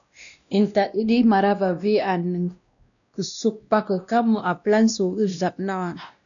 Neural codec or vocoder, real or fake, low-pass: codec, 16 kHz, 1 kbps, X-Codec, WavLM features, trained on Multilingual LibriSpeech; fake; 7.2 kHz